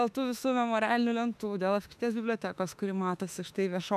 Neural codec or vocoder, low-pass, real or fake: autoencoder, 48 kHz, 32 numbers a frame, DAC-VAE, trained on Japanese speech; 14.4 kHz; fake